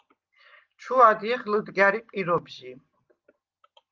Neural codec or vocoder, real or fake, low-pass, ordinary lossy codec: none; real; 7.2 kHz; Opus, 32 kbps